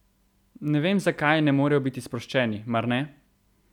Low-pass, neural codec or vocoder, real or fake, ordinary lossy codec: 19.8 kHz; none; real; none